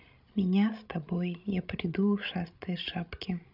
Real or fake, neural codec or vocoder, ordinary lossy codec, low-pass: fake; codec, 16 kHz, 16 kbps, FreqCodec, larger model; none; 5.4 kHz